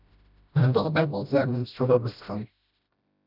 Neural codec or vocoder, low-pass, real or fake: codec, 16 kHz, 0.5 kbps, FreqCodec, smaller model; 5.4 kHz; fake